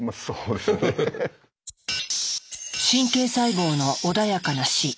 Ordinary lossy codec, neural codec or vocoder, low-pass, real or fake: none; none; none; real